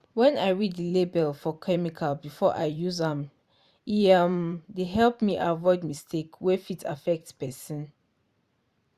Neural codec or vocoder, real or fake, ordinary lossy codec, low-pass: vocoder, 44.1 kHz, 128 mel bands every 512 samples, BigVGAN v2; fake; Opus, 64 kbps; 14.4 kHz